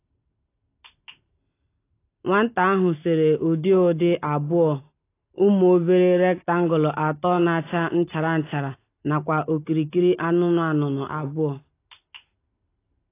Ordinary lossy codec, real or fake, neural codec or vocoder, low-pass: AAC, 24 kbps; real; none; 3.6 kHz